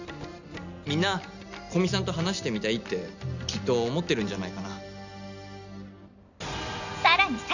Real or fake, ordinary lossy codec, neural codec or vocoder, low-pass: real; none; none; 7.2 kHz